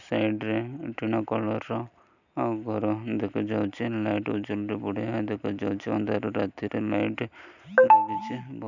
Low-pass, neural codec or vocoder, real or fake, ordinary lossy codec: 7.2 kHz; none; real; none